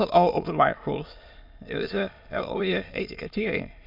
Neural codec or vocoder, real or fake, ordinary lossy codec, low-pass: autoencoder, 22.05 kHz, a latent of 192 numbers a frame, VITS, trained on many speakers; fake; MP3, 48 kbps; 5.4 kHz